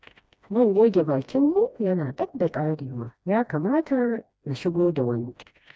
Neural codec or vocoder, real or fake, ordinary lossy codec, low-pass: codec, 16 kHz, 1 kbps, FreqCodec, smaller model; fake; none; none